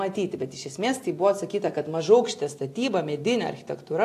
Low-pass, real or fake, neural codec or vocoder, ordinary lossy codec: 14.4 kHz; real; none; AAC, 64 kbps